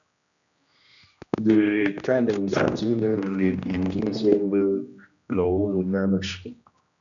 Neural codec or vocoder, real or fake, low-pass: codec, 16 kHz, 1 kbps, X-Codec, HuBERT features, trained on general audio; fake; 7.2 kHz